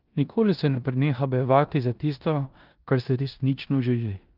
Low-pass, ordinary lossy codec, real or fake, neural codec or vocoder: 5.4 kHz; Opus, 32 kbps; fake; codec, 16 kHz in and 24 kHz out, 0.9 kbps, LongCat-Audio-Codec, four codebook decoder